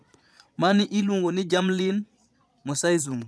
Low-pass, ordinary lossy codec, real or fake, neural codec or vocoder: none; none; fake; vocoder, 22.05 kHz, 80 mel bands, Vocos